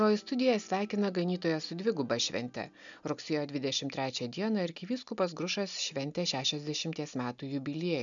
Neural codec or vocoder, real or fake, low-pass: none; real; 7.2 kHz